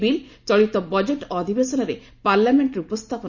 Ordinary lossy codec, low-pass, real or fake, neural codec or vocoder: none; 7.2 kHz; real; none